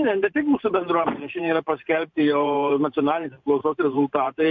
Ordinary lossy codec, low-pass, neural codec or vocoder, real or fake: MP3, 64 kbps; 7.2 kHz; vocoder, 44.1 kHz, 128 mel bands every 512 samples, BigVGAN v2; fake